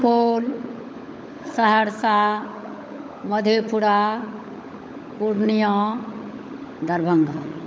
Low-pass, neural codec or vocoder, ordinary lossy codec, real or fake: none; codec, 16 kHz, 16 kbps, FunCodec, trained on LibriTTS, 50 frames a second; none; fake